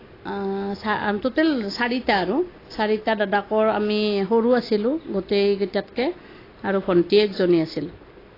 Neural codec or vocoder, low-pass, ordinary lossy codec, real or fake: none; 5.4 kHz; AAC, 24 kbps; real